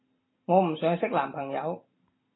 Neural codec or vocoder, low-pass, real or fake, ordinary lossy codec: none; 7.2 kHz; real; AAC, 16 kbps